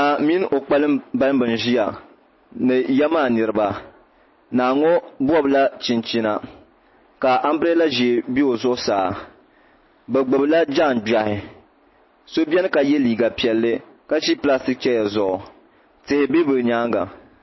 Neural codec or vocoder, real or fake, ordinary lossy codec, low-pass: none; real; MP3, 24 kbps; 7.2 kHz